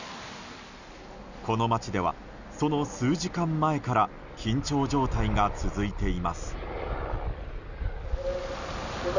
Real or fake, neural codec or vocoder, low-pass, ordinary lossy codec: real; none; 7.2 kHz; none